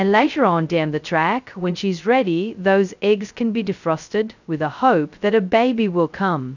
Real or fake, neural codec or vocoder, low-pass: fake; codec, 16 kHz, 0.2 kbps, FocalCodec; 7.2 kHz